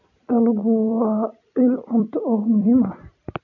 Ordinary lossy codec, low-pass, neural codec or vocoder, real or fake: none; 7.2 kHz; vocoder, 44.1 kHz, 128 mel bands every 256 samples, BigVGAN v2; fake